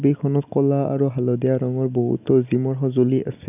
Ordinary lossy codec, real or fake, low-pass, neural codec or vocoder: none; real; 3.6 kHz; none